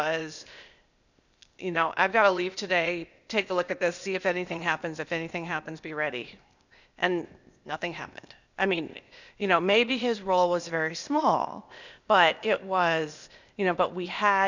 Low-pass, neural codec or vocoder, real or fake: 7.2 kHz; codec, 16 kHz, 0.8 kbps, ZipCodec; fake